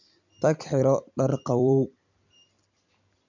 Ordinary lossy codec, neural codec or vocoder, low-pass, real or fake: none; vocoder, 44.1 kHz, 128 mel bands every 256 samples, BigVGAN v2; 7.2 kHz; fake